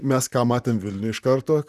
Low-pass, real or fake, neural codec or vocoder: 14.4 kHz; fake; vocoder, 44.1 kHz, 128 mel bands, Pupu-Vocoder